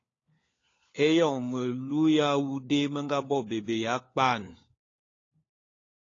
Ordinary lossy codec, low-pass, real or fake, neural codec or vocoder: AAC, 32 kbps; 7.2 kHz; fake; codec, 16 kHz, 4 kbps, FunCodec, trained on LibriTTS, 50 frames a second